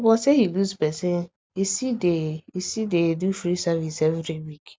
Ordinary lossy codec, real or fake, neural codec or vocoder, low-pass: none; real; none; none